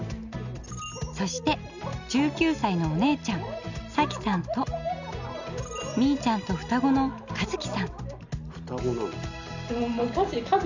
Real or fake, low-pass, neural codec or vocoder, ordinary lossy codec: real; 7.2 kHz; none; none